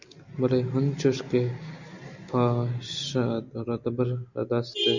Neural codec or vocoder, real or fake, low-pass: none; real; 7.2 kHz